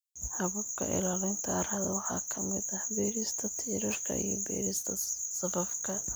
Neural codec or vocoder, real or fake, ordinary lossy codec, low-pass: none; real; none; none